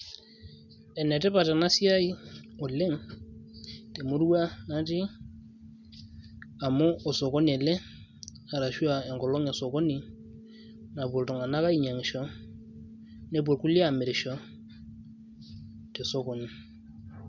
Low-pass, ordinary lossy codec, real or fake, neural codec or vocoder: 7.2 kHz; none; real; none